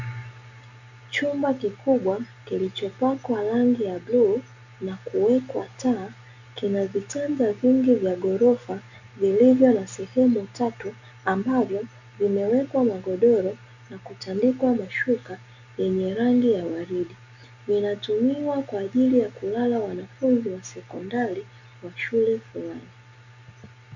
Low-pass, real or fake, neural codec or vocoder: 7.2 kHz; real; none